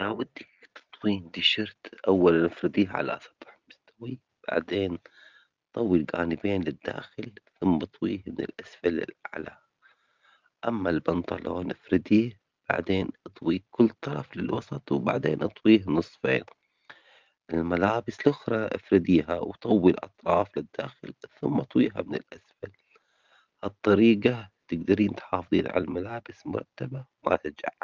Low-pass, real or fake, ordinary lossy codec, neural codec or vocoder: 7.2 kHz; real; Opus, 32 kbps; none